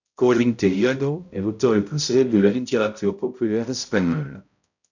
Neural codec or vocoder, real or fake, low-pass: codec, 16 kHz, 0.5 kbps, X-Codec, HuBERT features, trained on balanced general audio; fake; 7.2 kHz